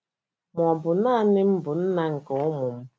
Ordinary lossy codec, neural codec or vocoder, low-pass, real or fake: none; none; none; real